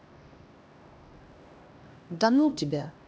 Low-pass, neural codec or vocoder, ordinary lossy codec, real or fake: none; codec, 16 kHz, 1 kbps, X-Codec, HuBERT features, trained on LibriSpeech; none; fake